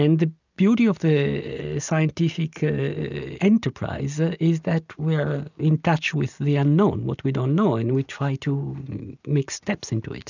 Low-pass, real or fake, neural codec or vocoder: 7.2 kHz; real; none